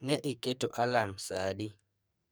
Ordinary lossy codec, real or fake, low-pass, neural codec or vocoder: none; fake; none; codec, 44.1 kHz, 2.6 kbps, SNAC